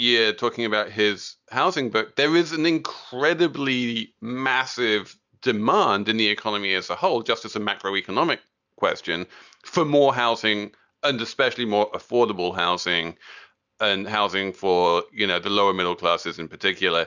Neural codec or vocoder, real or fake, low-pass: none; real; 7.2 kHz